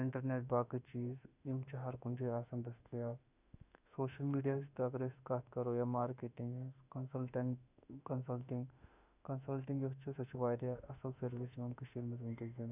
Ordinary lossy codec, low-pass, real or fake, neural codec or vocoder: none; 3.6 kHz; fake; autoencoder, 48 kHz, 32 numbers a frame, DAC-VAE, trained on Japanese speech